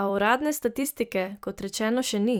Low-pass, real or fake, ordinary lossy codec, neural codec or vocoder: none; fake; none; vocoder, 44.1 kHz, 128 mel bands every 256 samples, BigVGAN v2